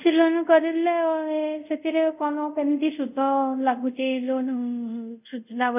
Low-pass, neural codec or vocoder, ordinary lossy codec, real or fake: 3.6 kHz; codec, 24 kHz, 0.5 kbps, DualCodec; none; fake